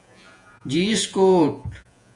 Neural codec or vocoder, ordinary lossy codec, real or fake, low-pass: vocoder, 48 kHz, 128 mel bands, Vocos; MP3, 64 kbps; fake; 10.8 kHz